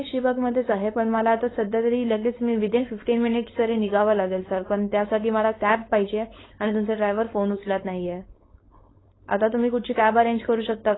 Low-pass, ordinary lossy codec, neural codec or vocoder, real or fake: 7.2 kHz; AAC, 16 kbps; codec, 16 kHz, 4.8 kbps, FACodec; fake